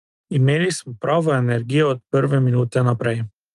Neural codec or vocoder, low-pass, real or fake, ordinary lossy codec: none; 10.8 kHz; real; Opus, 32 kbps